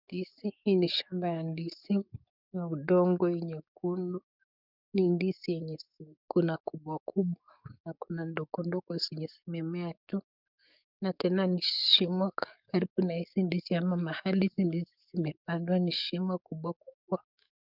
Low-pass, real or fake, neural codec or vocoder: 5.4 kHz; fake; codec, 44.1 kHz, 7.8 kbps, DAC